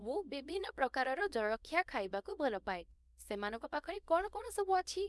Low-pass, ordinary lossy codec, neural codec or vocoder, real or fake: none; none; codec, 24 kHz, 0.9 kbps, WavTokenizer, small release; fake